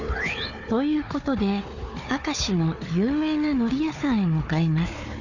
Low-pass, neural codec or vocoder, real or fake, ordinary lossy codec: 7.2 kHz; codec, 16 kHz, 4 kbps, FunCodec, trained on Chinese and English, 50 frames a second; fake; none